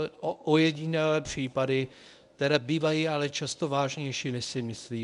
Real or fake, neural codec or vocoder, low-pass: fake; codec, 24 kHz, 0.9 kbps, WavTokenizer, medium speech release version 1; 10.8 kHz